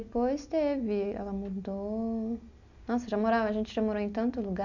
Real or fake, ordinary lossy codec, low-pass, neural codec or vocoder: real; none; 7.2 kHz; none